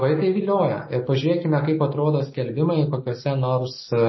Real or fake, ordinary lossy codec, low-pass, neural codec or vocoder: real; MP3, 24 kbps; 7.2 kHz; none